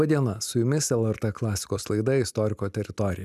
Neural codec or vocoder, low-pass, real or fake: none; 14.4 kHz; real